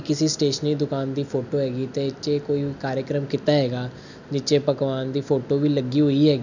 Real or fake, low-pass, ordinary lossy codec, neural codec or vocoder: real; 7.2 kHz; none; none